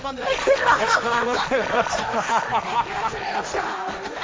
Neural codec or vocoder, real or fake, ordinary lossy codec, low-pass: codec, 16 kHz, 1.1 kbps, Voila-Tokenizer; fake; none; none